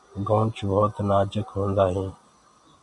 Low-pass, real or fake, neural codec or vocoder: 10.8 kHz; real; none